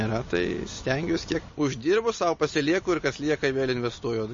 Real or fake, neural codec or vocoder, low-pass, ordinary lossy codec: real; none; 7.2 kHz; MP3, 32 kbps